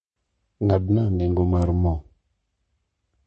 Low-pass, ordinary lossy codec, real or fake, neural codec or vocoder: 10.8 kHz; MP3, 32 kbps; fake; codec, 44.1 kHz, 7.8 kbps, Pupu-Codec